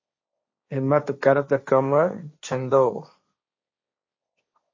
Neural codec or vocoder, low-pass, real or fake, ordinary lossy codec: codec, 16 kHz, 1.1 kbps, Voila-Tokenizer; 7.2 kHz; fake; MP3, 32 kbps